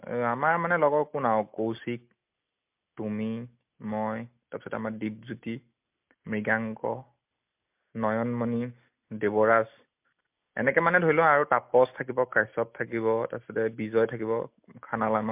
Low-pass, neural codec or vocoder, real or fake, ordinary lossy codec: 3.6 kHz; none; real; MP3, 32 kbps